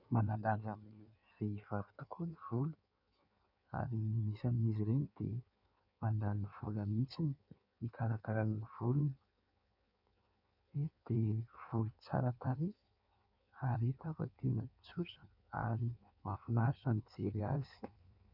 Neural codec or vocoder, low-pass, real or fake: codec, 16 kHz in and 24 kHz out, 1.1 kbps, FireRedTTS-2 codec; 5.4 kHz; fake